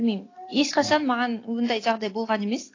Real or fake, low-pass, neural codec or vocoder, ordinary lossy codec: real; 7.2 kHz; none; AAC, 32 kbps